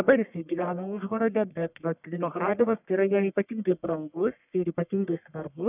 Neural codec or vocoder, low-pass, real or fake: codec, 44.1 kHz, 1.7 kbps, Pupu-Codec; 3.6 kHz; fake